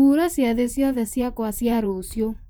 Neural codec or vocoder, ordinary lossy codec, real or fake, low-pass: vocoder, 44.1 kHz, 128 mel bands, Pupu-Vocoder; none; fake; none